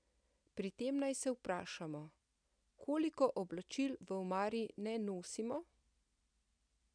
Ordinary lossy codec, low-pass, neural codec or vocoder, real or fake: none; 9.9 kHz; none; real